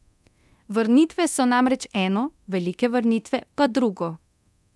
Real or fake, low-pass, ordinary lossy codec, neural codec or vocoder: fake; none; none; codec, 24 kHz, 0.9 kbps, DualCodec